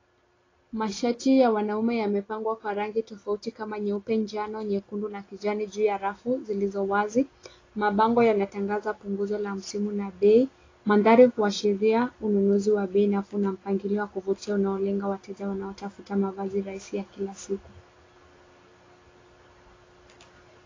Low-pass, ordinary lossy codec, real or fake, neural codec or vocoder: 7.2 kHz; AAC, 32 kbps; real; none